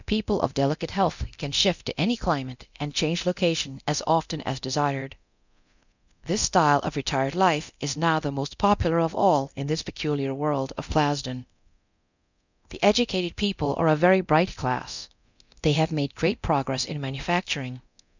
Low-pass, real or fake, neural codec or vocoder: 7.2 kHz; fake; codec, 24 kHz, 0.9 kbps, DualCodec